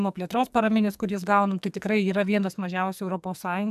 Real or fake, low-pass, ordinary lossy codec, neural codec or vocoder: fake; 14.4 kHz; AAC, 96 kbps; codec, 44.1 kHz, 2.6 kbps, SNAC